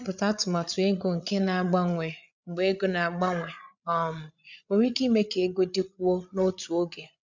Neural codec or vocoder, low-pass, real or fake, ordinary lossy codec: codec, 16 kHz, 8 kbps, FreqCodec, larger model; 7.2 kHz; fake; none